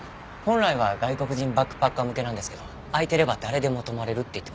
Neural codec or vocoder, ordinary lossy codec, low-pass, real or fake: none; none; none; real